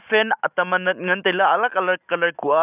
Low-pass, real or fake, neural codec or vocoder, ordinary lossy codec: 3.6 kHz; real; none; none